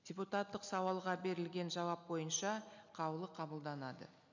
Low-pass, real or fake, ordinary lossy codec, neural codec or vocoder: 7.2 kHz; real; none; none